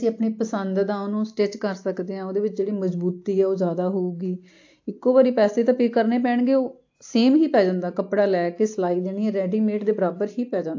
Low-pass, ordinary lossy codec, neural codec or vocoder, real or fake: 7.2 kHz; AAC, 48 kbps; none; real